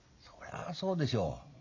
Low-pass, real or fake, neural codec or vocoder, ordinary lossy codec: 7.2 kHz; real; none; none